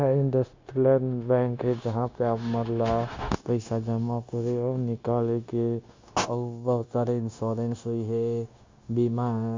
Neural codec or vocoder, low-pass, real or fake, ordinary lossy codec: codec, 16 kHz, 0.9 kbps, LongCat-Audio-Codec; 7.2 kHz; fake; MP3, 64 kbps